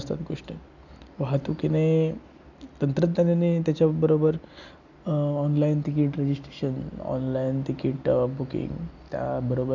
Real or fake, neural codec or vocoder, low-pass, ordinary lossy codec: real; none; 7.2 kHz; none